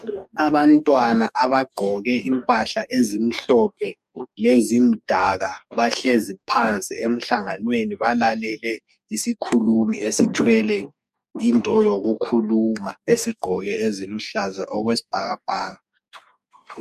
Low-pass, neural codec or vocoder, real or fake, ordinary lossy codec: 14.4 kHz; codec, 44.1 kHz, 2.6 kbps, DAC; fake; MP3, 96 kbps